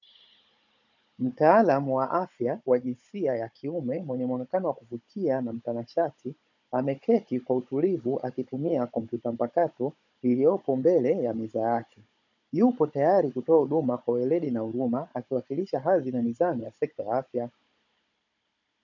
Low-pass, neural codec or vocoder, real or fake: 7.2 kHz; codec, 16 kHz, 16 kbps, FunCodec, trained on Chinese and English, 50 frames a second; fake